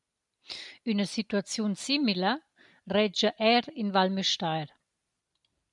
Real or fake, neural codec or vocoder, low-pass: real; none; 10.8 kHz